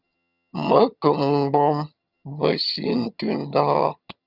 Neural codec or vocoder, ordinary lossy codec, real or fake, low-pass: vocoder, 22.05 kHz, 80 mel bands, HiFi-GAN; Opus, 64 kbps; fake; 5.4 kHz